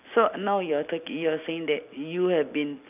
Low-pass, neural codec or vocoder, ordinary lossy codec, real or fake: 3.6 kHz; none; none; real